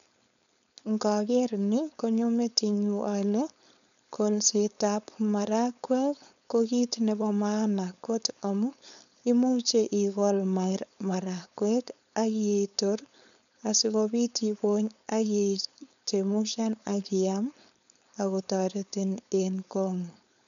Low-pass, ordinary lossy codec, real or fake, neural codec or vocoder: 7.2 kHz; none; fake; codec, 16 kHz, 4.8 kbps, FACodec